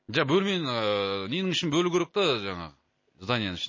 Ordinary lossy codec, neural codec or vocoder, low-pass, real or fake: MP3, 32 kbps; none; 7.2 kHz; real